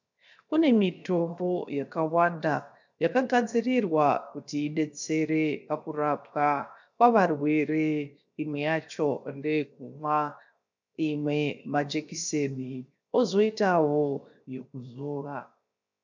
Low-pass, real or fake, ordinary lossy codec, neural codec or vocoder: 7.2 kHz; fake; MP3, 64 kbps; codec, 16 kHz, 0.7 kbps, FocalCodec